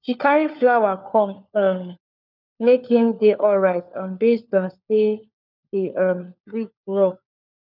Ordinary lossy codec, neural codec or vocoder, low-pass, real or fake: none; codec, 16 kHz, 4 kbps, FunCodec, trained on LibriTTS, 50 frames a second; 5.4 kHz; fake